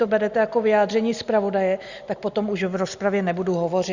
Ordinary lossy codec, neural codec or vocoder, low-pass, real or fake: Opus, 64 kbps; none; 7.2 kHz; real